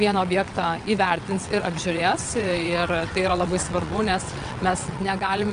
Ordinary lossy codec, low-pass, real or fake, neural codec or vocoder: AAC, 96 kbps; 9.9 kHz; fake; vocoder, 22.05 kHz, 80 mel bands, WaveNeXt